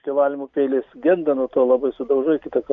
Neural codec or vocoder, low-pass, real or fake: none; 7.2 kHz; real